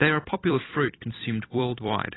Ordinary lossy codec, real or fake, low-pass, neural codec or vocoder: AAC, 16 kbps; fake; 7.2 kHz; vocoder, 44.1 kHz, 128 mel bands every 256 samples, BigVGAN v2